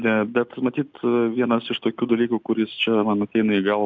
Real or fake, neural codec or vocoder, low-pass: real; none; 7.2 kHz